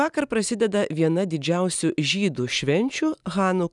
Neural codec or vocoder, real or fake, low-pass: none; real; 10.8 kHz